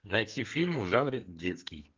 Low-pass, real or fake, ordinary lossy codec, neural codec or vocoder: 7.2 kHz; fake; Opus, 24 kbps; codec, 32 kHz, 1.9 kbps, SNAC